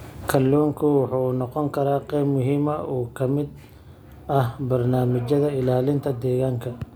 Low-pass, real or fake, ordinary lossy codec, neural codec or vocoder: none; real; none; none